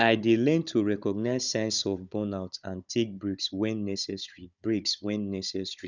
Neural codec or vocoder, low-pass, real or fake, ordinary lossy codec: codec, 16 kHz, 16 kbps, FunCodec, trained on Chinese and English, 50 frames a second; 7.2 kHz; fake; none